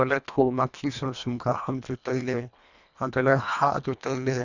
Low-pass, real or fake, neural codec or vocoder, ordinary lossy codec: 7.2 kHz; fake; codec, 24 kHz, 1.5 kbps, HILCodec; none